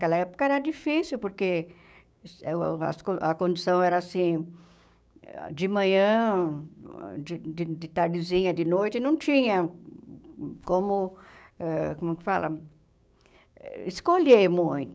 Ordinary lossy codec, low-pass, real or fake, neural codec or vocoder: none; none; fake; codec, 16 kHz, 6 kbps, DAC